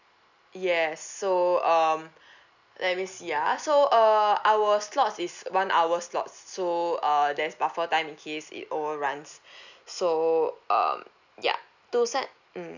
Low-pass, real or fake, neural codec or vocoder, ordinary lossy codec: 7.2 kHz; real; none; none